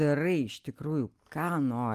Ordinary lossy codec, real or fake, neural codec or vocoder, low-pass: Opus, 32 kbps; real; none; 14.4 kHz